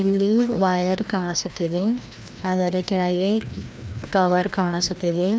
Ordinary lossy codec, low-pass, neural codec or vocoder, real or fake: none; none; codec, 16 kHz, 1 kbps, FreqCodec, larger model; fake